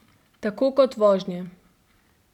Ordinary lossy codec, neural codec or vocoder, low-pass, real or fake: none; none; 19.8 kHz; real